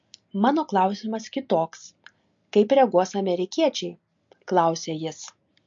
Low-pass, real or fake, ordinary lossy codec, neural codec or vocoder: 7.2 kHz; real; MP3, 48 kbps; none